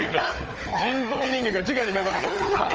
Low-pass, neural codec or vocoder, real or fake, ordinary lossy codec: 7.2 kHz; codec, 16 kHz, 4 kbps, FunCodec, trained on Chinese and English, 50 frames a second; fake; Opus, 24 kbps